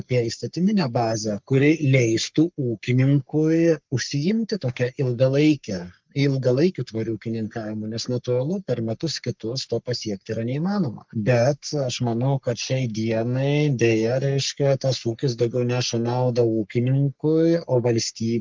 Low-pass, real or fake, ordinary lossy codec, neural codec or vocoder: 7.2 kHz; fake; Opus, 24 kbps; codec, 44.1 kHz, 3.4 kbps, Pupu-Codec